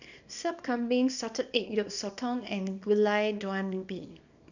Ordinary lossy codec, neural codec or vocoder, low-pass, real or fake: none; codec, 24 kHz, 0.9 kbps, WavTokenizer, small release; 7.2 kHz; fake